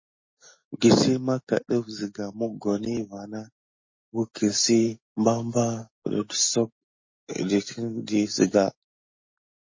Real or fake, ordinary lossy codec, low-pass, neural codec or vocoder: real; MP3, 32 kbps; 7.2 kHz; none